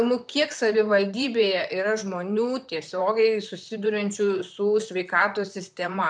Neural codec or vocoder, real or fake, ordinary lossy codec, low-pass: vocoder, 44.1 kHz, 128 mel bands, Pupu-Vocoder; fake; MP3, 96 kbps; 9.9 kHz